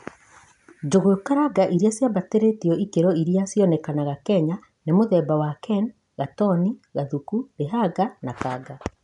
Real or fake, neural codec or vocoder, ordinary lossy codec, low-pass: real; none; none; 10.8 kHz